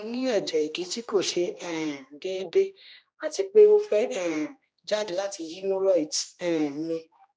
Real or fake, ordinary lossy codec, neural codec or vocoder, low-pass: fake; none; codec, 16 kHz, 1 kbps, X-Codec, HuBERT features, trained on general audio; none